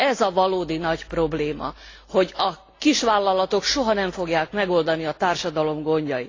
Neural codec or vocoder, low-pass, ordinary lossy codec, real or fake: none; 7.2 kHz; AAC, 32 kbps; real